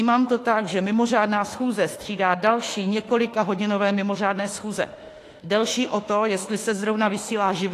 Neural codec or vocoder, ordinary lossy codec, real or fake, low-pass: autoencoder, 48 kHz, 32 numbers a frame, DAC-VAE, trained on Japanese speech; AAC, 48 kbps; fake; 14.4 kHz